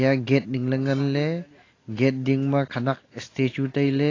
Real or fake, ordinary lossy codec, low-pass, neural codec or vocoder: real; AAC, 32 kbps; 7.2 kHz; none